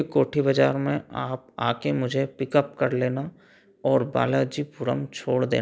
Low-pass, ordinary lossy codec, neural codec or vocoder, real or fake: none; none; none; real